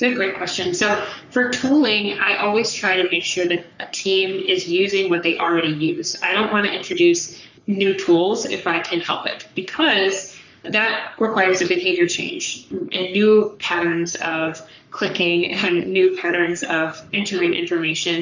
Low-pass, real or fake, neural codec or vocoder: 7.2 kHz; fake; codec, 44.1 kHz, 3.4 kbps, Pupu-Codec